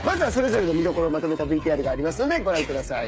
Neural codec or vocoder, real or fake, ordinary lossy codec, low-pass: codec, 16 kHz, 8 kbps, FreqCodec, smaller model; fake; none; none